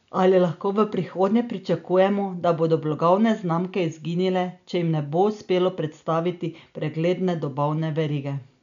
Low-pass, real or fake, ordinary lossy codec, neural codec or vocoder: 7.2 kHz; real; none; none